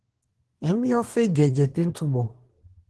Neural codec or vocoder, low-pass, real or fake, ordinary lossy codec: codec, 24 kHz, 1 kbps, SNAC; 10.8 kHz; fake; Opus, 16 kbps